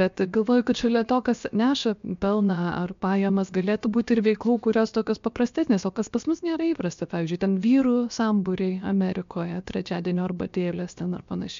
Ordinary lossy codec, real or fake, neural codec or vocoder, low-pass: MP3, 64 kbps; fake; codec, 16 kHz, 0.7 kbps, FocalCodec; 7.2 kHz